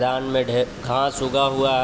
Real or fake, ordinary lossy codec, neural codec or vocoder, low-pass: real; none; none; none